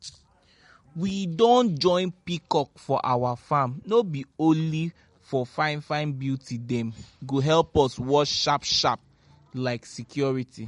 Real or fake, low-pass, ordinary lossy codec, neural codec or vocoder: real; 10.8 kHz; MP3, 48 kbps; none